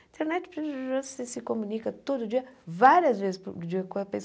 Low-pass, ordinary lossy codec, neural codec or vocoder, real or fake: none; none; none; real